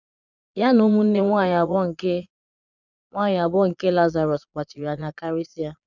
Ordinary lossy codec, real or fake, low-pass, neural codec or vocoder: none; fake; 7.2 kHz; vocoder, 22.05 kHz, 80 mel bands, Vocos